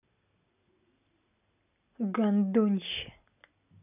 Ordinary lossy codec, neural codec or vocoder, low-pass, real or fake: none; none; 3.6 kHz; real